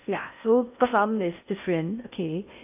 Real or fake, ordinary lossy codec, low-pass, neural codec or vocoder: fake; AAC, 32 kbps; 3.6 kHz; codec, 16 kHz in and 24 kHz out, 0.6 kbps, FocalCodec, streaming, 4096 codes